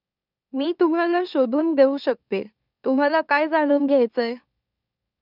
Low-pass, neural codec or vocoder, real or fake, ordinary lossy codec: 5.4 kHz; autoencoder, 44.1 kHz, a latent of 192 numbers a frame, MeloTTS; fake; none